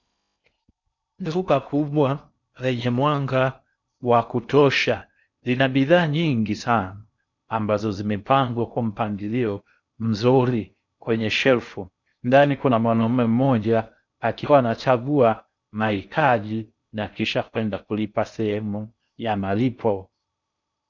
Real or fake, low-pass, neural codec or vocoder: fake; 7.2 kHz; codec, 16 kHz in and 24 kHz out, 0.6 kbps, FocalCodec, streaming, 4096 codes